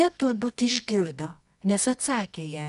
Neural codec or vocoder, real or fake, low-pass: codec, 24 kHz, 0.9 kbps, WavTokenizer, medium music audio release; fake; 10.8 kHz